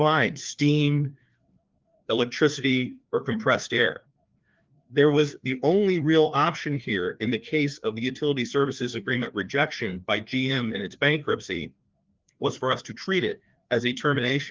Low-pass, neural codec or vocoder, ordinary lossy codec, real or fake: 7.2 kHz; codec, 16 kHz, 2 kbps, FreqCodec, larger model; Opus, 24 kbps; fake